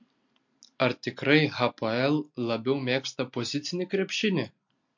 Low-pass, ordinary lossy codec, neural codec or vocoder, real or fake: 7.2 kHz; MP3, 48 kbps; none; real